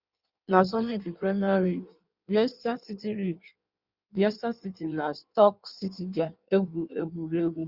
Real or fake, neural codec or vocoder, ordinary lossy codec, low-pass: fake; codec, 16 kHz in and 24 kHz out, 1.1 kbps, FireRedTTS-2 codec; Opus, 64 kbps; 5.4 kHz